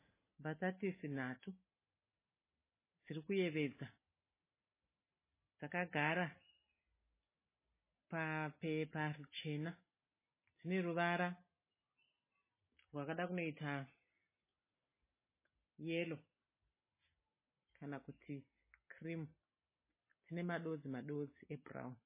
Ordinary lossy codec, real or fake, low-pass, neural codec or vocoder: MP3, 16 kbps; real; 3.6 kHz; none